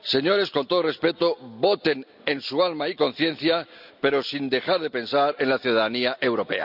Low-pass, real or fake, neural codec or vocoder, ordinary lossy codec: 5.4 kHz; real; none; none